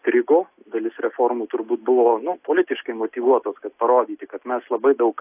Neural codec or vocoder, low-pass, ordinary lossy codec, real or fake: none; 3.6 kHz; AAC, 32 kbps; real